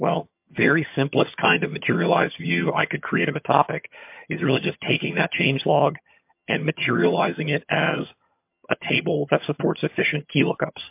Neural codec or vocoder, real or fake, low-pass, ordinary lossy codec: vocoder, 22.05 kHz, 80 mel bands, HiFi-GAN; fake; 3.6 kHz; MP3, 32 kbps